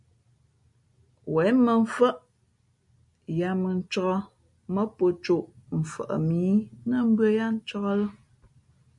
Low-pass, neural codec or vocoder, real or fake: 10.8 kHz; none; real